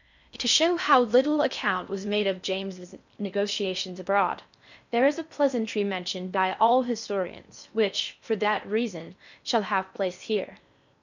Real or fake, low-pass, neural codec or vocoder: fake; 7.2 kHz; codec, 16 kHz in and 24 kHz out, 0.6 kbps, FocalCodec, streaming, 4096 codes